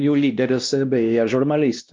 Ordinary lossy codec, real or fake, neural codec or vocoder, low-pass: Opus, 24 kbps; fake; codec, 16 kHz, 1 kbps, X-Codec, WavLM features, trained on Multilingual LibriSpeech; 7.2 kHz